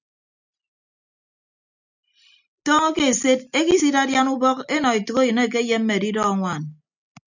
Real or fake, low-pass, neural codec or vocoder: real; 7.2 kHz; none